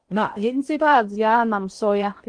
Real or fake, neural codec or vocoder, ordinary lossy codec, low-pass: fake; codec, 16 kHz in and 24 kHz out, 0.8 kbps, FocalCodec, streaming, 65536 codes; Opus, 24 kbps; 9.9 kHz